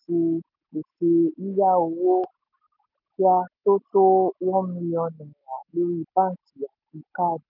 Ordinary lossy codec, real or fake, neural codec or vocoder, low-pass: none; real; none; 5.4 kHz